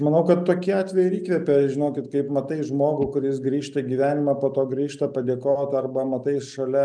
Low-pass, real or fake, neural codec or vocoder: 9.9 kHz; real; none